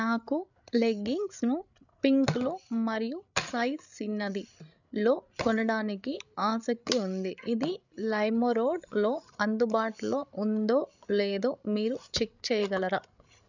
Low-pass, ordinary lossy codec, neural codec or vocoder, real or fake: 7.2 kHz; none; codec, 16 kHz, 8 kbps, FreqCodec, larger model; fake